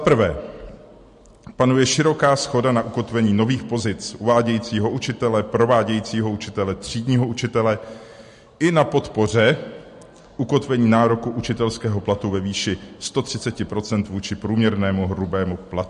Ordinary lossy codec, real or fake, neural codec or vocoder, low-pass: MP3, 48 kbps; real; none; 14.4 kHz